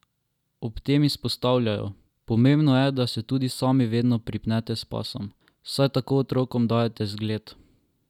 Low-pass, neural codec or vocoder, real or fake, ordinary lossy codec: 19.8 kHz; none; real; none